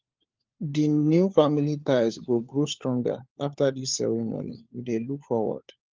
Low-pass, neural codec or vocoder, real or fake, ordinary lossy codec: 7.2 kHz; codec, 16 kHz, 4 kbps, FunCodec, trained on LibriTTS, 50 frames a second; fake; Opus, 32 kbps